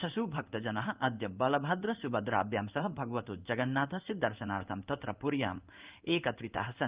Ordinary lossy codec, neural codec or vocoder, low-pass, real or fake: Opus, 24 kbps; codec, 16 kHz in and 24 kHz out, 1 kbps, XY-Tokenizer; 3.6 kHz; fake